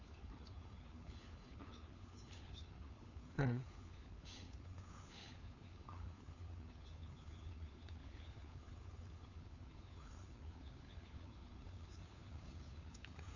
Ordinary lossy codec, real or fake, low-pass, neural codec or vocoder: AAC, 48 kbps; fake; 7.2 kHz; codec, 16 kHz, 4 kbps, FunCodec, trained on LibriTTS, 50 frames a second